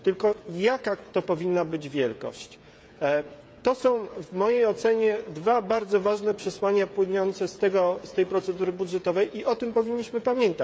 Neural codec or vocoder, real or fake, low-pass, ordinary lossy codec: codec, 16 kHz, 16 kbps, FreqCodec, smaller model; fake; none; none